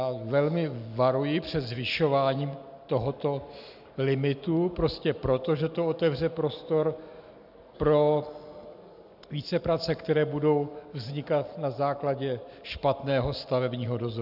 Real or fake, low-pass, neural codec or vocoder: real; 5.4 kHz; none